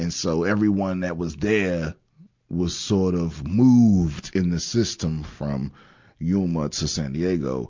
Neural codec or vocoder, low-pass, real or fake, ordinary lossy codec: none; 7.2 kHz; real; MP3, 64 kbps